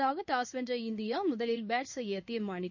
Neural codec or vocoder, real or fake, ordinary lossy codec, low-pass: codec, 16 kHz in and 24 kHz out, 1 kbps, XY-Tokenizer; fake; none; 7.2 kHz